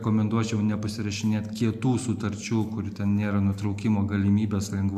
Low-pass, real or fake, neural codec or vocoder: 14.4 kHz; real; none